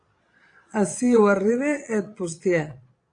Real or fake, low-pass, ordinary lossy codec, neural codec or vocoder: fake; 9.9 kHz; AAC, 48 kbps; vocoder, 22.05 kHz, 80 mel bands, Vocos